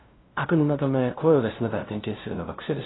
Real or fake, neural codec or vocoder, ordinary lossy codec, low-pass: fake; codec, 16 kHz, 0.5 kbps, FunCodec, trained on LibriTTS, 25 frames a second; AAC, 16 kbps; 7.2 kHz